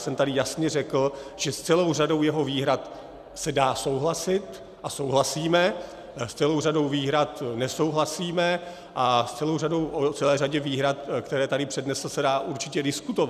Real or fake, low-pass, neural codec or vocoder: real; 14.4 kHz; none